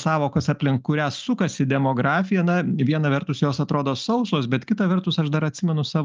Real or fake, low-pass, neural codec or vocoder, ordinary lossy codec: real; 7.2 kHz; none; Opus, 24 kbps